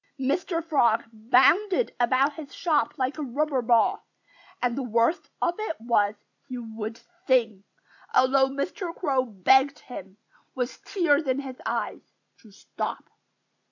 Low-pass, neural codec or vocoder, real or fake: 7.2 kHz; none; real